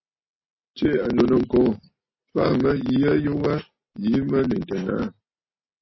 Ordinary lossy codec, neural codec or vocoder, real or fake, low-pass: MP3, 24 kbps; none; real; 7.2 kHz